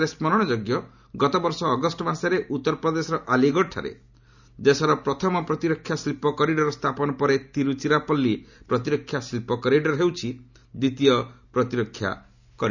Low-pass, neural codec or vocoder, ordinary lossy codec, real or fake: 7.2 kHz; none; none; real